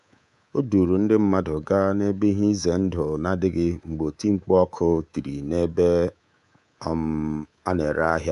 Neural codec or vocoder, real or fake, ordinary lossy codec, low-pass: codec, 24 kHz, 3.1 kbps, DualCodec; fake; none; 10.8 kHz